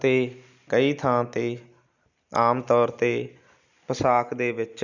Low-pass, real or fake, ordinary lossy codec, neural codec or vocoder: 7.2 kHz; real; none; none